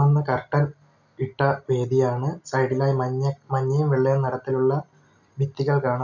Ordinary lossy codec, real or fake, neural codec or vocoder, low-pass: none; real; none; 7.2 kHz